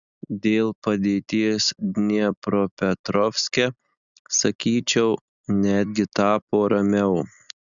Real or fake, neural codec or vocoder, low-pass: real; none; 7.2 kHz